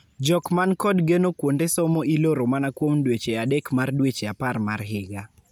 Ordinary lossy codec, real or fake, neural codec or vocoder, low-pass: none; real; none; none